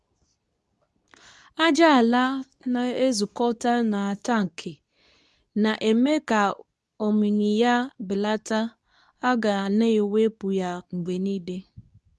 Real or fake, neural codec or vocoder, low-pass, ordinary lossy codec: fake; codec, 24 kHz, 0.9 kbps, WavTokenizer, medium speech release version 2; none; none